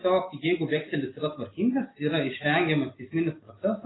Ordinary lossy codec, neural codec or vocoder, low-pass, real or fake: AAC, 16 kbps; none; 7.2 kHz; real